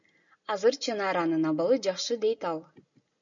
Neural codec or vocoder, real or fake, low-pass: none; real; 7.2 kHz